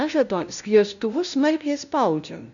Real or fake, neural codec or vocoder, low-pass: fake; codec, 16 kHz, 0.5 kbps, FunCodec, trained on LibriTTS, 25 frames a second; 7.2 kHz